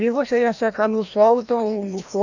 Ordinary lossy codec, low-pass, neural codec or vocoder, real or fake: none; 7.2 kHz; codec, 16 kHz, 1 kbps, FreqCodec, larger model; fake